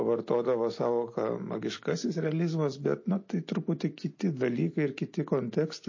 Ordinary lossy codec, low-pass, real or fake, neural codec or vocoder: MP3, 32 kbps; 7.2 kHz; real; none